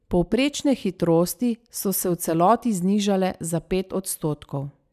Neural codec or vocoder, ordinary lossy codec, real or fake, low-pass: none; none; real; 14.4 kHz